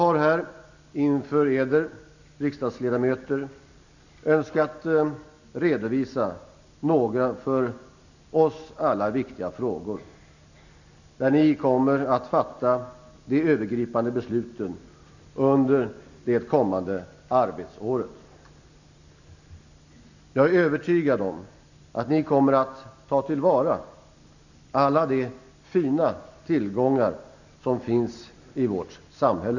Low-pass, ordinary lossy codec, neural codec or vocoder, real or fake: 7.2 kHz; none; none; real